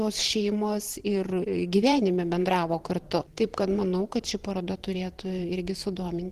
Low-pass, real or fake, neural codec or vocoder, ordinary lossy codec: 14.4 kHz; fake; vocoder, 44.1 kHz, 128 mel bands every 512 samples, BigVGAN v2; Opus, 16 kbps